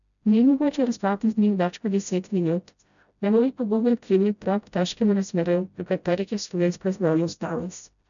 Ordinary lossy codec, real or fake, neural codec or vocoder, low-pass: none; fake; codec, 16 kHz, 0.5 kbps, FreqCodec, smaller model; 7.2 kHz